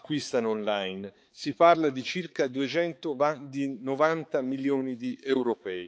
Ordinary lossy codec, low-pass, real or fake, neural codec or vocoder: none; none; fake; codec, 16 kHz, 4 kbps, X-Codec, HuBERT features, trained on balanced general audio